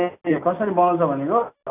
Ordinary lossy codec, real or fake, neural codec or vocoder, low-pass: none; real; none; 3.6 kHz